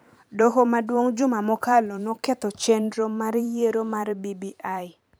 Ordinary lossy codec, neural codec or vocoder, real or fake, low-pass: none; vocoder, 44.1 kHz, 128 mel bands every 512 samples, BigVGAN v2; fake; none